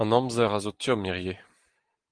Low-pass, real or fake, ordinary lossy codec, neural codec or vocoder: 9.9 kHz; real; Opus, 24 kbps; none